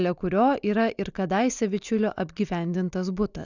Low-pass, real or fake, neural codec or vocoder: 7.2 kHz; real; none